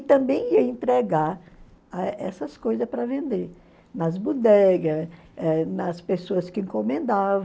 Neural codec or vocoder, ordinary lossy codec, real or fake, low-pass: none; none; real; none